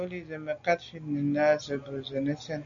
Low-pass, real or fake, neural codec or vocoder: 7.2 kHz; real; none